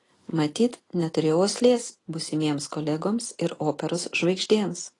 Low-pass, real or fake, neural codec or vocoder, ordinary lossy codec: 10.8 kHz; real; none; AAC, 32 kbps